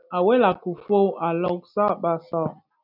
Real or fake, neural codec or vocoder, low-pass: real; none; 5.4 kHz